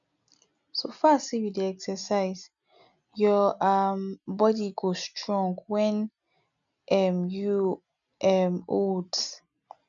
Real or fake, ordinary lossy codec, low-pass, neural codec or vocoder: real; none; 7.2 kHz; none